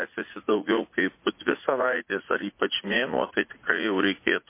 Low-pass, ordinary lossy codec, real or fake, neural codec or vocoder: 3.6 kHz; MP3, 24 kbps; fake; vocoder, 44.1 kHz, 80 mel bands, Vocos